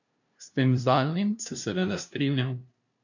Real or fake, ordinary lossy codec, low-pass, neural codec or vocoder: fake; none; 7.2 kHz; codec, 16 kHz, 0.5 kbps, FunCodec, trained on LibriTTS, 25 frames a second